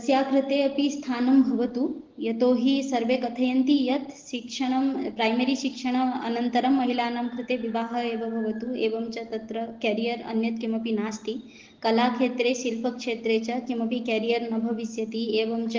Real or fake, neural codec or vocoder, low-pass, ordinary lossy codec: real; none; 7.2 kHz; Opus, 16 kbps